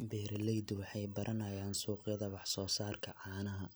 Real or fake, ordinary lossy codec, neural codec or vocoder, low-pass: real; none; none; none